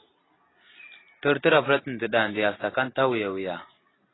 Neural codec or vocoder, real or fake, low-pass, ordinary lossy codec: none; real; 7.2 kHz; AAC, 16 kbps